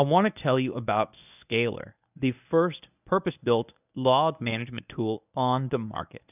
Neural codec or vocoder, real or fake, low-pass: codec, 24 kHz, 0.9 kbps, WavTokenizer, medium speech release version 1; fake; 3.6 kHz